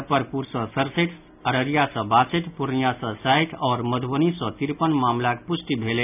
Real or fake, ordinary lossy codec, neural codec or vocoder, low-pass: real; none; none; 3.6 kHz